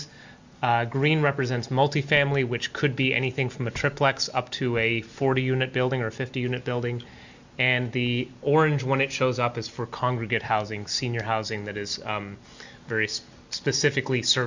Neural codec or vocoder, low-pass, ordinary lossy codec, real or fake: none; 7.2 kHz; Opus, 64 kbps; real